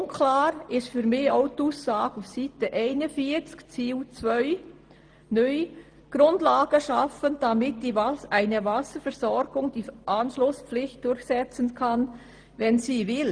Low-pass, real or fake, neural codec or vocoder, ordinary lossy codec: 9.9 kHz; real; none; Opus, 24 kbps